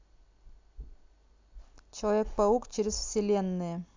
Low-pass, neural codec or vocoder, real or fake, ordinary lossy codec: 7.2 kHz; none; real; MP3, 64 kbps